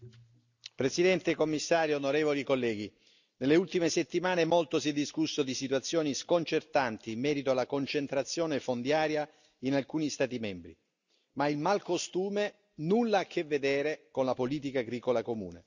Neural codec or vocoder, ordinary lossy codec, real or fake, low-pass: none; none; real; 7.2 kHz